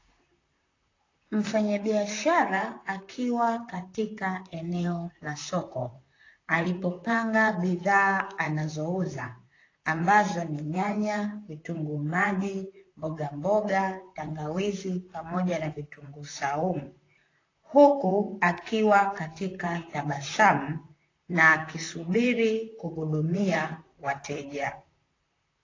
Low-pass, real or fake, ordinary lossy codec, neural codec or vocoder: 7.2 kHz; fake; AAC, 32 kbps; vocoder, 44.1 kHz, 128 mel bands, Pupu-Vocoder